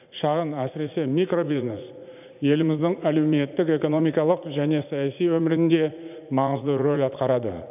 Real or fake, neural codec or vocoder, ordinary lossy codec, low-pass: fake; vocoder, 44.1 kHz, 80 mel bands, Vocos; none; 3.6 kHz